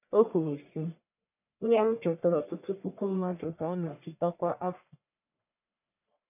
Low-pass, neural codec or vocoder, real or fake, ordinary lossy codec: 3.6 kHz; codec, 44.1 kHz, 1.7 kbps, Pupu-Codec; fake; none